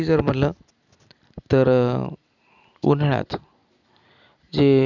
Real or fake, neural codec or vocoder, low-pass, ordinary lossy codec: real; none; 7.2 kHz; none